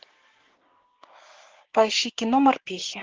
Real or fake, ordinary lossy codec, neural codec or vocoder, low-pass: fake; Opus, 16 kbps; codec, 16 kHz, 6 kbps, DAC; 7.2 kHz